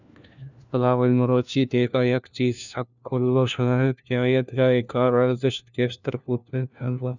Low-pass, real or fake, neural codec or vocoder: 7.2 kHz; fake; codec, 16 kHz, 1 kbps, FunCodec, trained on LibriTTS, 50 frames a second